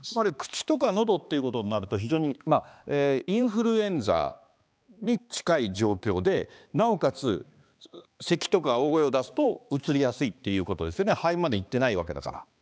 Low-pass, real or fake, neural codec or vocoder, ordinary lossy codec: none; fake; codec, 16 kHz, 2 kbps, X-Codec, HuBERT features, trained on balanced general audio; none